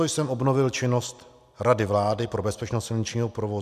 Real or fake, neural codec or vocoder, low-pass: real; none; 14.4 kHz